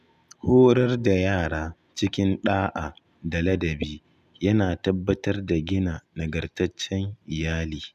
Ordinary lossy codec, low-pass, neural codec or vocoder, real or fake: none; 14.4 kHz; none; real